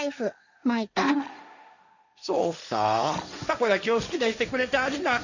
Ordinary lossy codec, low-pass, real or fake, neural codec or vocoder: none; 7.2 kHz; fake; codec, 16 kHz, 1.1 kbps, Voila-Tokenizer